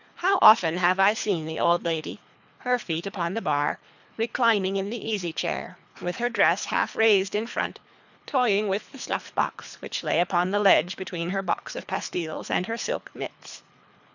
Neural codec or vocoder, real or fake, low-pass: codec, 24 kHz, 3 kbps, HILCodec; fake; 7.2 kHz